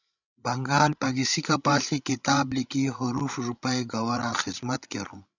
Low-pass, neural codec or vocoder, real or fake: 7.2 kHz; codec, 16 kHz, 16 kbps, FreqCodec, larger model; fake